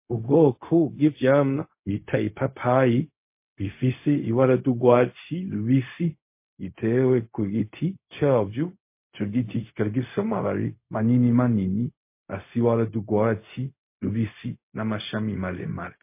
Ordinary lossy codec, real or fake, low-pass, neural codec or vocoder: MP3, 24 kbps; fake; 3.6 kHz; codec, 16 kHz, 0.4 kbps, LongCat-Audio-Codec